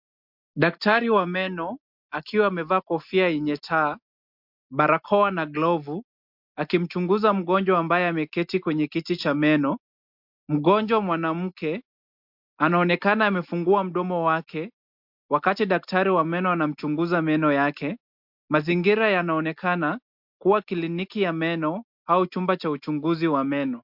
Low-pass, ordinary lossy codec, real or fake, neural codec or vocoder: 5.4 kHz; MP3, 48 kbps; real; none